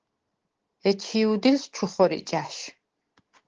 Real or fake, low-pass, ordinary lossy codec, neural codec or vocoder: real; 7.2 kHz; Opus, 16 kbps; none